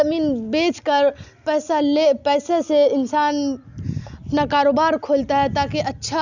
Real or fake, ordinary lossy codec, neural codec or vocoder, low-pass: real; none; none; 7.2 kHz